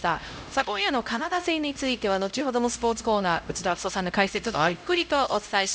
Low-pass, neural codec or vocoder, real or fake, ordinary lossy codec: none; codec, 16 kHz, 0.5 kbps, X-Codec, HuBERT features, trained on LibriSpeech; fake; none